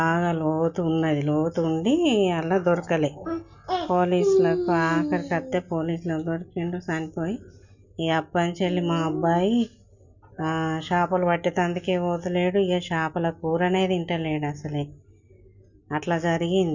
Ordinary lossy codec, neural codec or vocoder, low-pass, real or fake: none; none; 7.2 kHz; real